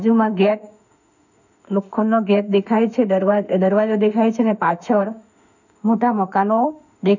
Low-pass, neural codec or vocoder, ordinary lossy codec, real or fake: 7.2 kHz; autoencoder, 48 kHz, 32 numbers a frame, DAC-VAE, trained on Japanese speech; none; fake